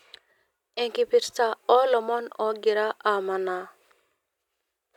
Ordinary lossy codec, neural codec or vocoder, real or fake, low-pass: none; none; real; 19.8 kHz